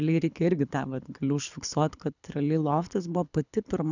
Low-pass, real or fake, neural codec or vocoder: 7.2 kHz; fake; codec, 24 kHz, 6 kbps, HILCodec